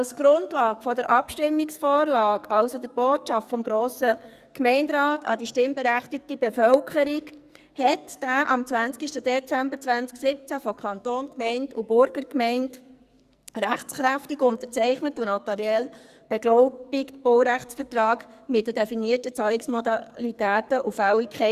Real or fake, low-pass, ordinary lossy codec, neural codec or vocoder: fake; 14.4 kHz; Opus, 64 kbps; codec, 44.1 kHz, 2.6 kbps, SNAC